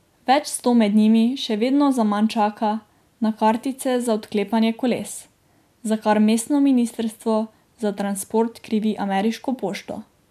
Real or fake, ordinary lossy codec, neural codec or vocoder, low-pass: real; MP3, 96 kbps; none; 14.4 kHz